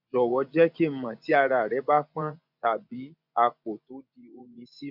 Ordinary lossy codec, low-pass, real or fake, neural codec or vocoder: none; 5.4 kHz; fake; vocoder, 24 kHz, 100 mel bands, Vocos